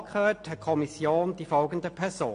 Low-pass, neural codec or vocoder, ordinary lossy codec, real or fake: 9.9 kHz; none; AAC, 48 kbps; real